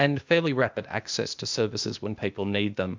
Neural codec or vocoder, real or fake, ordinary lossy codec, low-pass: codec, 16 kHz, 0.8 kbps, ZipCodec; fake; MP3, 64 kbps; 7.2 kHz